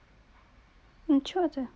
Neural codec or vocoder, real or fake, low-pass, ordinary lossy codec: none; real; none; none